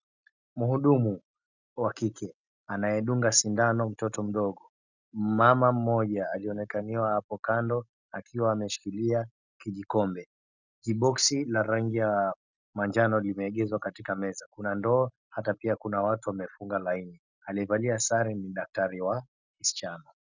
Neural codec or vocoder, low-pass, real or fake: none; 7.2 kHz; real